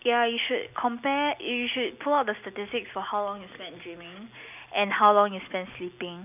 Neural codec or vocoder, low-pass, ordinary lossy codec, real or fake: none; 3.6 kHz; none; real